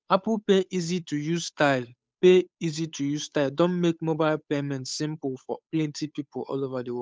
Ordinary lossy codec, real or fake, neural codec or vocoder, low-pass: none; fake; codec, 16 kHz, 8 kbps, FunCodec, trained on Chinese and English, 25 frames a second; none